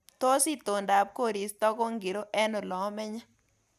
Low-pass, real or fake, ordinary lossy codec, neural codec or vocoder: 14.4 kHz; real; none; none